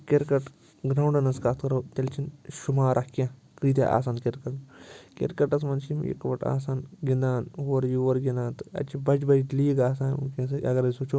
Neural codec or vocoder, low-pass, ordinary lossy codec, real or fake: none; none; none; real